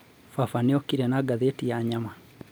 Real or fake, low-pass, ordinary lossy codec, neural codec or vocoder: real; none; none; none